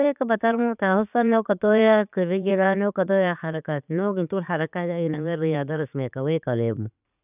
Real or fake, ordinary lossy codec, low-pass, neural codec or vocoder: fake; none; 3.6 kHz; vocoder, 44.1 kHz, 80 mel bands, Vocos